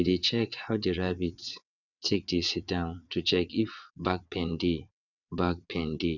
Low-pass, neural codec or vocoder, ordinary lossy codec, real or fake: 7.2 kHz; vocoder, 22.05 kHz, 80 mel bands, WaveNeXt; none; fake